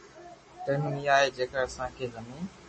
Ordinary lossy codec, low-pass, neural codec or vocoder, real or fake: MP3, 32 kbps; 10.8 kHz; none; real